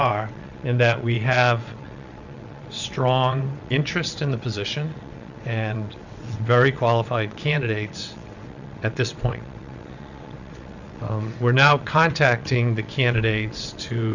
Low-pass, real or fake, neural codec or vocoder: 7.2 kHz; fake; vocoder, 22.05 kHz, 80 mel bands, WaveNeXt